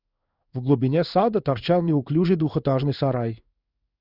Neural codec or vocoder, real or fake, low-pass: codec, 16 kHz in and 24 kHz out, 1 kbps, XY-Tokenizer; fake; 5.4 kHz